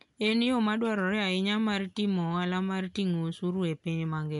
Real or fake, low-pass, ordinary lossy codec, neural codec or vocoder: real; 10.8 kHz; MP3, 96 kbps; none